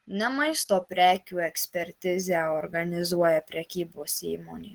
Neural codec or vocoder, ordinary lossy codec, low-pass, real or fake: none; Opus, 16 kbps; 19.8 kHz; real